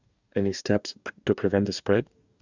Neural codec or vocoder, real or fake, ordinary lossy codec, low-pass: codec, 44.1 kHz, 2.6 kbps, DAC; fake; Opus, 64 kbps; 7.2 kHz